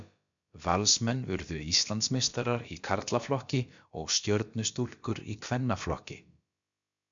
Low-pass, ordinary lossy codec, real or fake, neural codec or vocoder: 7.2 kHz; MP3, 64 kbps; fake; codec, 16 kHz, about 1 kbps, DyCAST, with the encoder's durations